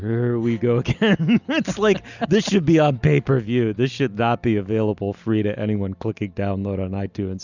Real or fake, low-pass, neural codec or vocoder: real; 7.2 kHz; none